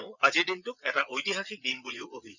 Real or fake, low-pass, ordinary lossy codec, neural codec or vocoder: fake; 7.2 kHz; none; vocoder, 22.05 kHz, 80 mel bands, WaveNeXt